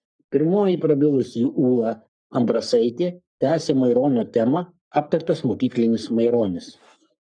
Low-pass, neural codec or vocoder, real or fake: 9.9 kHz; codec, 44.1 kHz, 3.4 kbps, Pupu-Codec; fake